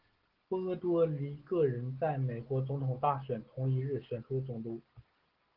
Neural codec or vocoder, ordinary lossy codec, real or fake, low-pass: none; Opus, 16 kbps; real; 5.4 kHz